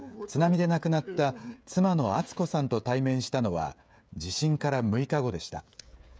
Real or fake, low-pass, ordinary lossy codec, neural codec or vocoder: fake; none; none; codec, 16 kHz, 16 kbps, FreqCodec, smaller model